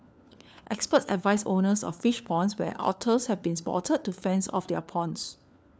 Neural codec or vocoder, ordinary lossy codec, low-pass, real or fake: codec, 16 kHz, 4 kbps, FunCodec, trained on LibriTTS, 50 frames a second; none; none; fake